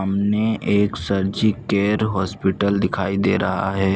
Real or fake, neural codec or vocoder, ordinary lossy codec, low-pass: real; none; none; none